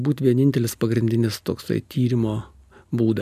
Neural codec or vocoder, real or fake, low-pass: none; real; 14.4 kHz